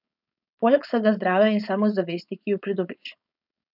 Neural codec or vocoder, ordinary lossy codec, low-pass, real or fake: codec, 16 kHz, 4.8 kbps, FACodec; none; 5.4 kHz; fake